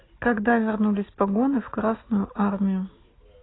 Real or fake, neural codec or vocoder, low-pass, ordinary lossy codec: real; none; 7.2 kHz; AAC, 16 kbps